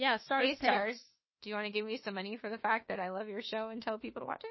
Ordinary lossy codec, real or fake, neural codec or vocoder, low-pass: MP3, 24 kbps; fake; autoencoder, 48 kHz, 32 numbers a frame, DAC-VAE, trained on Japanese speech; 7.2 kHz